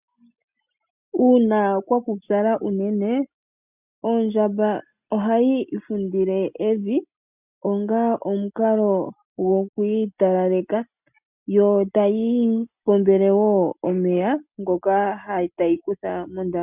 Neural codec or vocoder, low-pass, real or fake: none; 3.6 kHz; real